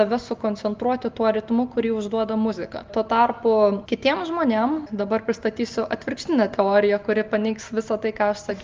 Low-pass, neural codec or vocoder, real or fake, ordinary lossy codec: 7.2 kHz; none; real; Opus, 24 kbps